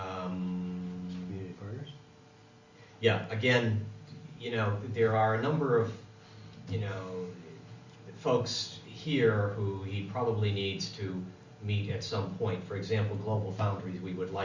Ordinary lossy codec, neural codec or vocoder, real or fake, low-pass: Opus, 64 kbps; none; real; 7.2 kHz